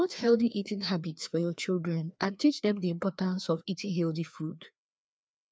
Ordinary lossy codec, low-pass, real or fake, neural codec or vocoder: none; none; fake; codec, 16 kHz, 2 kbps, FreqCodec, larger model